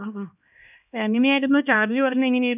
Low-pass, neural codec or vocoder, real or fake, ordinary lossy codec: 3.6 kHz; codec, 16 kHz, 1 kbps, X-Codec, HuBERT features, trained on LibriSpeech; fake; none